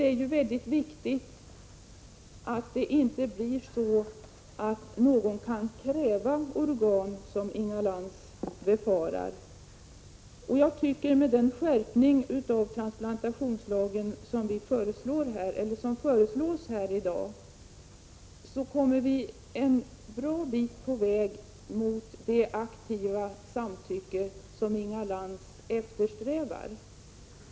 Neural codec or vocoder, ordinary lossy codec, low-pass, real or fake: none; none; none; real